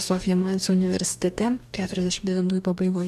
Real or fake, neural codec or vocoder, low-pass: fake; codec, 44.1 kHz, 2.6 kbps, DAC; 14.4 kHz